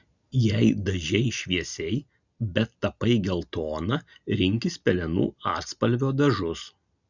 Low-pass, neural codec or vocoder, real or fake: 7.2 kHz; none; real